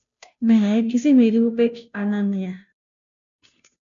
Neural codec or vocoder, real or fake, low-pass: codec, 16 kHz, 0.5 kbps, FunCodec, trained on Chinese and English, 25 frames a second; fake; 7.2 kHz